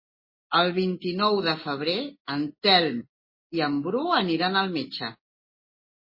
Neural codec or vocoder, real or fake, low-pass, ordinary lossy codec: none; real; 5.4 kHz; MP3, 24 kbps